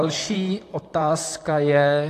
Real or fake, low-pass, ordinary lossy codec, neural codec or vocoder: fake; 14.4 kHz; AAC, 64 kbps; vocoder, 44.1 kHz, 128 mel bands, Pupu-Vocoder